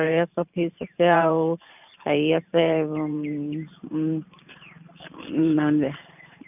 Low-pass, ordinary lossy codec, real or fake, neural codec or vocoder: 3.6 kHz; none; fake; vocoder, 44.1 kHz, 128 mel bands every 512 samples, BigVGAN v2